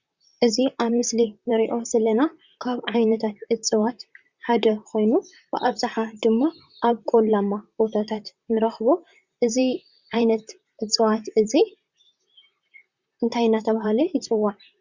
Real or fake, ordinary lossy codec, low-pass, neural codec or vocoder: fake; Opus, 64 kbps; 7.2 kHz; vocoder, 44.1 kHz, 128 mel bands, Pupu-Vocoder